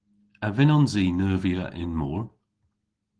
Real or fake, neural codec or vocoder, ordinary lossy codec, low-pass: real; none; Opus, 16 kbps; 9.9 kHz